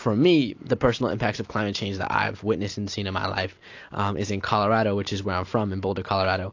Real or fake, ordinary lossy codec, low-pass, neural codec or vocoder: real; AAC, 48 kbps; 7.2 kHz; none